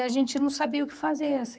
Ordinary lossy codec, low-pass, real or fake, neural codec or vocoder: none; none; fake; codec, 16 kHz, 4 kbps, X-Codec, HuBERT features, trained on general audio